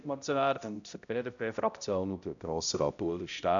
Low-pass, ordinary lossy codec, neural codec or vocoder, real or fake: 7.2 kHz; none; codec, 16 kHz, 0.5 kbps, X-Codec, HuBERT features, trained on balanced general audio; fake